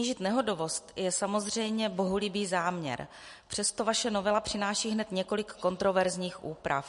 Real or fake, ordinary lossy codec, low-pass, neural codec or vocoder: real; MP3, 48 kbps; 10.8 kHz; none